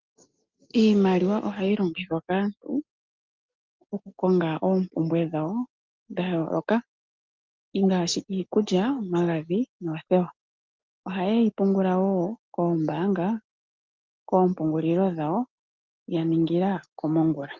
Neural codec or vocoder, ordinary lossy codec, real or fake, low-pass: none; Opus, 24 kbps; real; 7.2 kHz